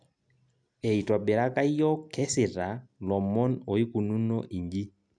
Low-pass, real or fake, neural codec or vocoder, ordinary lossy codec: 9.9 kHz; real; none; none